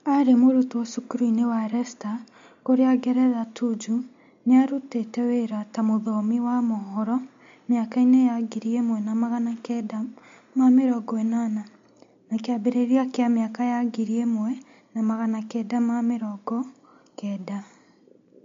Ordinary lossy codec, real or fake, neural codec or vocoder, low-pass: MP3, 48 kbps; real; none; 7.2 kHz